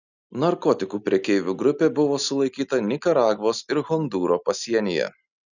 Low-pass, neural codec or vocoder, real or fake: 7.2 kHz; none; real